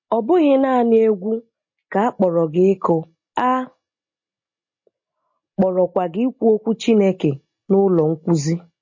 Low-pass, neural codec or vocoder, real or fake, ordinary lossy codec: 7.2 kHz; none; real; MP3, 32 kbps